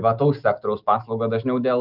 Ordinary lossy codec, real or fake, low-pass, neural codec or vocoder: Opus, 24 kbps; real; 5.4 kHz; none